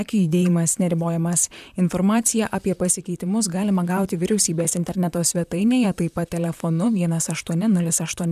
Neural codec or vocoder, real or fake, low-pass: vocoder, 44.1 kHz, 128 mel bands, Pupu-Vocoder; fake; 14.4 kHz